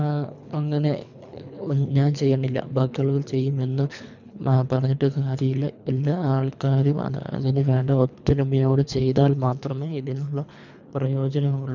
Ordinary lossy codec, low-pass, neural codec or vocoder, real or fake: none; 7.2 kHz; codec, 24 kHz, 3 kbps, HILCodec; fake